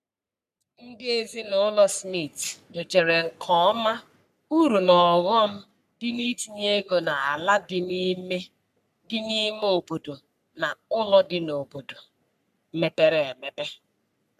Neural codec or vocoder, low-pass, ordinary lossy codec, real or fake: codec, 44.1 kHz, 3.4 kbps, Pupu-Codec; 14.4 kHz; none; fake